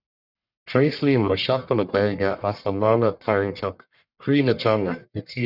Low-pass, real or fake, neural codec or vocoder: 5.4 kHz; fake; codec, 44.1 kHz, 1.7 kbps, Pupu-Codec